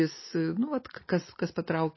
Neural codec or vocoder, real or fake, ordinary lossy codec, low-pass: none; real; MP3, 24 kbps; 7.2 kHz